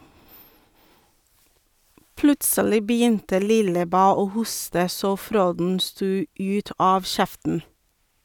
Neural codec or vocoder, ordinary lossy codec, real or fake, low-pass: none; none; real; 19.8 kHz